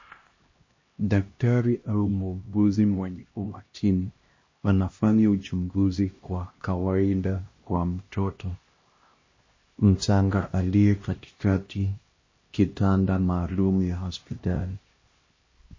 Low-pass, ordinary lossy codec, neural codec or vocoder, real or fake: 7.2 kHz; MP3, 32 kbps; codec, 16 kHz, 1 kbps, X-Codec, HuBERT features, trained on LibriSpeech; fake